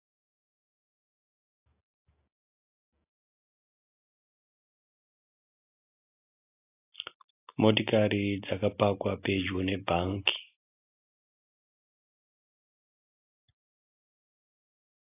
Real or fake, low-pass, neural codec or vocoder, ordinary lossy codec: real; 3.6 kHz; none; AAC, 24 kbps